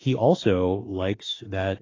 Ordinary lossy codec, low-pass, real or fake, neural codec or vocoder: AAC, 32 kbps; 7.2 kHz; fake; autoencoder, 48 kHz, 32 numbers a frame, DAC-VAE, trained on Japanese speech